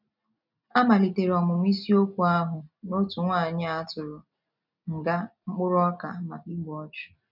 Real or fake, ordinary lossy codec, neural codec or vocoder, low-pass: real; none; none; 5.4 kHz